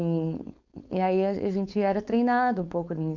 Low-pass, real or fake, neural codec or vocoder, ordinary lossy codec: 7.2 kHz; fake; codec, 16 kHz, 4.8 kbps, FACodec; none